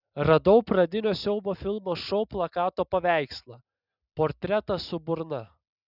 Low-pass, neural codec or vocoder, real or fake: 5.4 kHz; none; real